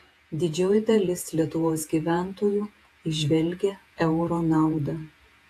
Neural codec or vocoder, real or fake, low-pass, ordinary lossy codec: vocoder, 48 kHz, 128 mel bands, Vocos; fake; 14.4 kHz; AAC, 64 kbps